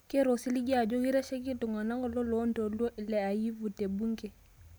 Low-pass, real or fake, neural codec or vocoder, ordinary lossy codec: none; real; none; none